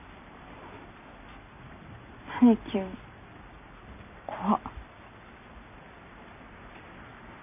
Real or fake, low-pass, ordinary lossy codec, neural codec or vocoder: real; 3.6 kHz; none; none